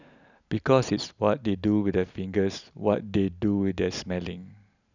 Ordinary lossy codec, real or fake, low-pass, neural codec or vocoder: none; real; 7.2 kHz; none